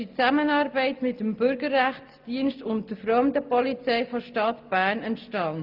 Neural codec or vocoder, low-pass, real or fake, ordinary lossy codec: none; 5.4 kHz; real; Opus, 32 kbps